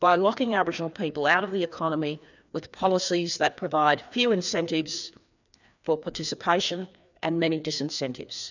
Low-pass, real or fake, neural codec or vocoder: 7.2 kHz; fake; codec, 16 kHz, 2 kbps, FreqCodec, larger model